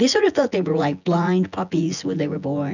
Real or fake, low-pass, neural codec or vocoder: fake; 7.2 kHz; vocoder, 24 kHz, 100 mel bands, Vocos